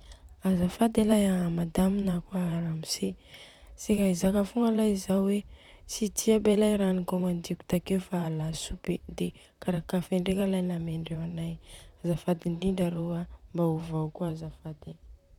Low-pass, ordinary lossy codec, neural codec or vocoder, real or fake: 19.8 kHz; none; vocoder, 44.1 kHz, 128 mel bands, Pupu-Vocoder; fake